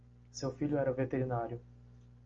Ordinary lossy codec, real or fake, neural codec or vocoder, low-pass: Opus, 32 kbps; real; none; 7.2 kHz